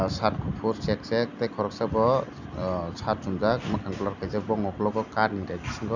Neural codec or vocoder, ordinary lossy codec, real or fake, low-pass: none; none; real; 7.2 kHz